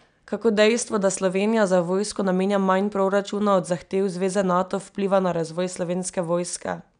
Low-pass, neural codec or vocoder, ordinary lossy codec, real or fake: 9.9 kHz; none; none; real